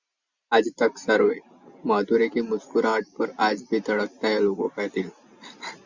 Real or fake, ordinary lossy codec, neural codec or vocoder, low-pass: real; Opus, 64 kbps; none; 7.2 kHz